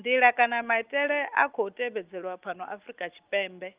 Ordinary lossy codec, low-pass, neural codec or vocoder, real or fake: Opus, 32 kbps; 3.6 kHz; none; real